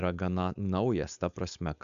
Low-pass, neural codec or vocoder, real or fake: 7.2 kHz; codec, 16 kHz, 4.8 kbps, FACodec; fake